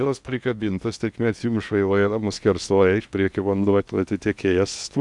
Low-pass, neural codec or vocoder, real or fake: 10.8 kHz; codec, 16 kHz in and 24 kHz out, 0.8 kbps, FocalCodec, streaming, 65536 codes; fake